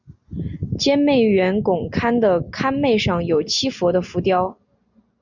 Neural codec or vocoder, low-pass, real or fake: none; 7.2 kHz; real